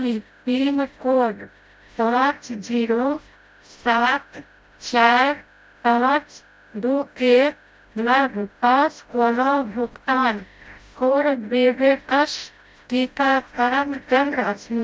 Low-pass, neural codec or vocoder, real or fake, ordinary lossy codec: none; codec, 16 kHz, 0.5 kbps, FreqCodec, smaller model; fake; none